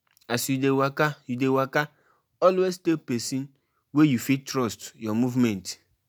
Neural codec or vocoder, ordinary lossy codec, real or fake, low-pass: none; none; real; none